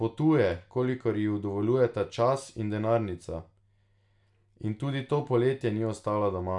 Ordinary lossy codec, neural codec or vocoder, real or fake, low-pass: none; none; real; 10.8 kHz